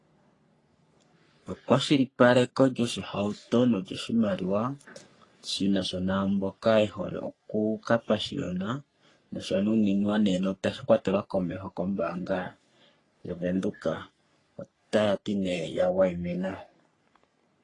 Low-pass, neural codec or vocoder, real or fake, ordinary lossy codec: 10.8 kHz; codec, 44.1 kHz, 3.4 kbps, Pupu-Codec; fake; AAC, 32 kbps